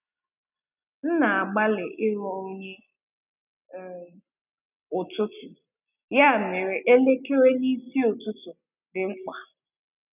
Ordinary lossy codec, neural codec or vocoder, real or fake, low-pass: none; none; real; 3.6 kHz